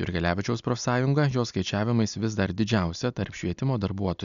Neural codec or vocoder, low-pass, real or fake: none; 7.2 kHz; real